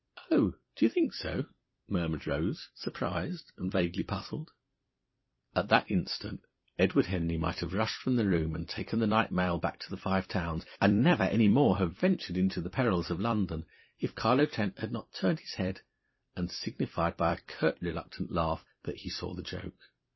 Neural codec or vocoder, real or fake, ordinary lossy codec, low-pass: vocoder, 44.1 kHz, 128 mel bands every 256 samples, BigVGAN v2; fake; MP3, 24 kbps; 7.2 kHz